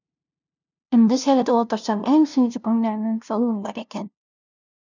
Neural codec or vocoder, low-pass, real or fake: codec, 16 kHz, 0.5 kbps, FunCodec, trained on LibriTTS, 25 frames a second; 7.2 kHz; fake